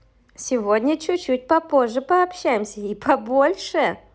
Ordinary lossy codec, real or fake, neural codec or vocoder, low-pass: none; real; none; none